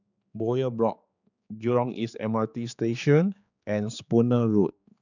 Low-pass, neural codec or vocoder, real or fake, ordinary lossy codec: 7.2 kHz; codec, 16 kHz, 4 kbps, X-Codec, HuBERT features, trained on general audio; fake; none